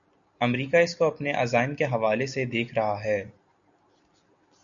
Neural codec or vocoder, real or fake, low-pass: none; real; 7.2 kHz